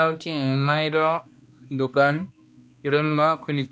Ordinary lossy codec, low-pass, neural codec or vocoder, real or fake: none; none; codec, 16 kHz, 1 kbps, X-Codec, HuBERT features, trained on balanced general audio; fake